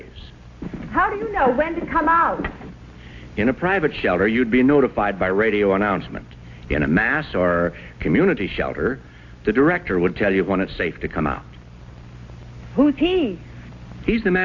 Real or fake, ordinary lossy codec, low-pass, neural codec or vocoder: real; AAC, 48 kbps; 7.2 kHz; none